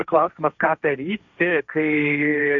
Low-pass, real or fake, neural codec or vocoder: 7.2 kHz; fake; codec, 16 kHz, 1.1 kbps, Voila-Tokenizer